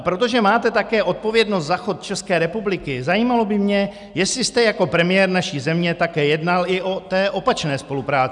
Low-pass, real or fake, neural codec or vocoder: 10.8 kHz; real; none